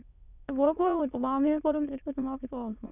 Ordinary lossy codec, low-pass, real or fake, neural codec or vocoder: none; 3.6 kHz; fake; autoencoder, 22.05 kHz, a latent of 192 numbers a frame, VITS, trained on many speakers